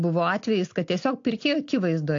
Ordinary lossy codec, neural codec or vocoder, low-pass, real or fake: AAC, 48 kbps; none; 7.2 kHz; real